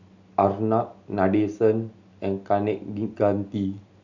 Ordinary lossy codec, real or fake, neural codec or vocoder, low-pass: none; real; none; 7.2 kHz